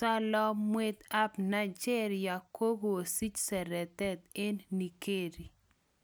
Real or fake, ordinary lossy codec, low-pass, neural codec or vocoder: real; none; none; none